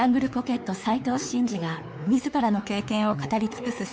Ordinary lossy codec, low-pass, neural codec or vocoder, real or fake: none; none; codec, 16 kHz, 4 kbps, X-Codec, WavLM features, trained on Multilingual LibriSpeech; fake